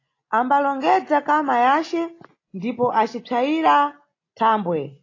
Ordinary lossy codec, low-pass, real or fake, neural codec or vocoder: AAC, 32 kbps; 7.2 kHz; real; none